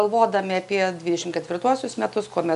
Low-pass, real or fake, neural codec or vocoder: 10.8 kHz; real; none